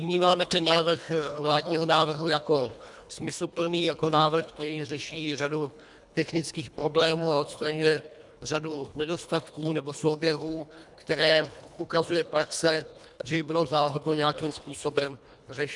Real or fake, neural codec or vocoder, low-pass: fake; codec, 24 kHz, 1.5 kbps, HILCodec; 10.8 kHz